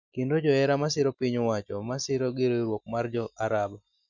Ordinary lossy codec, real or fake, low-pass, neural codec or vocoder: none; real; 7.2 kHz; none